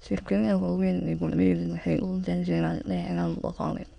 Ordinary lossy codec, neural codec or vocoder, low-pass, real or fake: none; autoencoder, 22.05 kHz, a latent of 192 numbers a frame, VITS, trained on many speakers; 9.9 kHz; fake